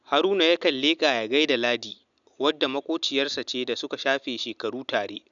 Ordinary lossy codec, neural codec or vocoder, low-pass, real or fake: none; none; 7.2 kHz; real